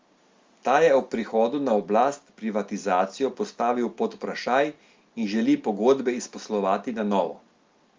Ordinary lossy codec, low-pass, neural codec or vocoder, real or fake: Opus, 32 kbps; 7.2 kHz; none; real